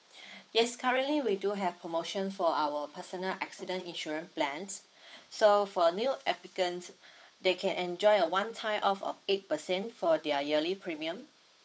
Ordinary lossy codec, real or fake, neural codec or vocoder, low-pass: none; fake; codec, 16 kHz, 8 kbps, FunCodec, trained on Chinese and English, 25 frames a second; none